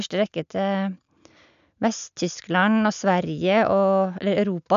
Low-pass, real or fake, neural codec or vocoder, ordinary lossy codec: 7.2 kHz; real; none; none